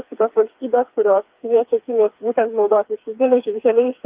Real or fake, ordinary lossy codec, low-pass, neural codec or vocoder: fake; Opus, 32 kbps; 3.6 kHz; codec, 16 kHz, 4 kbps, FreqCodec, smaller model